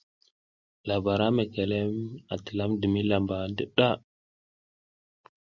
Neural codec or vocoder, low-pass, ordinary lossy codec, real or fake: none; 7.2 kHz; Opus, 64 kbps; real